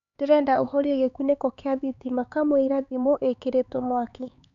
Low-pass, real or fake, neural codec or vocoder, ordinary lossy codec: 7.2 kHz; fake; codec, 16 kHz, 4 kbps, X-Codec, HuBERT features, trained on LibriSpeech; none